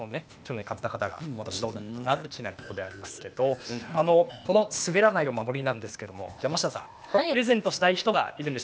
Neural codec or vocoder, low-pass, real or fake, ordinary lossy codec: codec, 16 kHz, 0.8 kbps, ZipCodec; none; fake; none